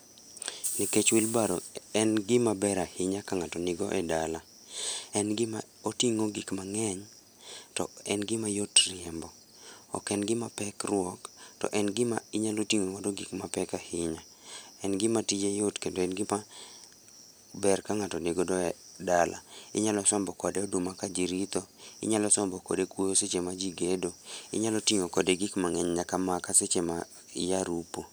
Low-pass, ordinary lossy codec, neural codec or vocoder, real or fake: none; none; none; real